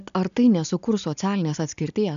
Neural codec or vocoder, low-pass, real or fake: none; 7.2 kHz; real